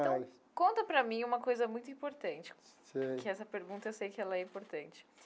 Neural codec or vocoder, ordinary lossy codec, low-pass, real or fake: none; none; none; real